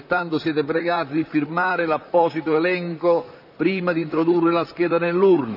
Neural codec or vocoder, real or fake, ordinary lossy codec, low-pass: vocoder, 44.1 kHz, 128 mel bands, Pupu-Vocoder; fake; none; 5.4 kHz